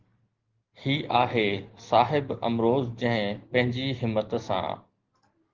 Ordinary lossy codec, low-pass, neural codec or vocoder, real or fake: Opus, 16 kbps; 7.2 kHz; none; real